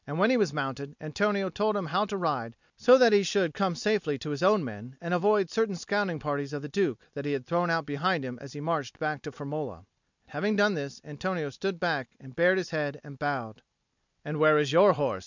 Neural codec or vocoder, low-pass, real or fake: none; 7.2 kHz; real